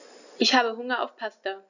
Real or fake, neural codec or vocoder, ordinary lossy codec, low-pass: real; none; none; 7.2 kHz